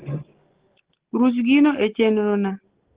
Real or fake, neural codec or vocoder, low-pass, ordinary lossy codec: real; none; 3.6 kHz; Opus, 16 kbps